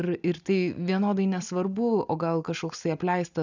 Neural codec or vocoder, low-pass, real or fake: none; 7.2 kHz; real